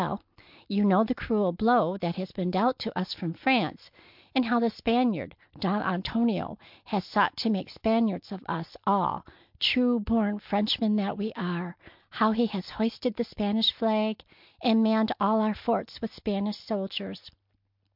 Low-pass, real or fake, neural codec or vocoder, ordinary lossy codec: 5.4 kHz; real; none; MP3, 48 kbps